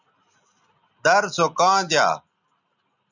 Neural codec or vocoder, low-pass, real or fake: none; 7.2 kHz; real